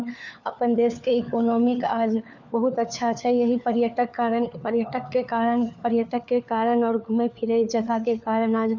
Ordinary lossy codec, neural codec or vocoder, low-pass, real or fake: none; codec, 16 kHz, 4 kbps, FunCodec, trained on LibriTTS, 50 frames a second; 7.2 kHz; fake